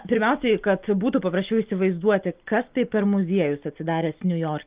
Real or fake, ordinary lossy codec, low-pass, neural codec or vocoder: real; Opus, 24 kbps; 3.6 kHz; none